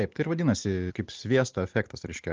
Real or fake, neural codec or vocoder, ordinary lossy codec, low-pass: real; none; Opus, 24 kbps; 7.2 kHz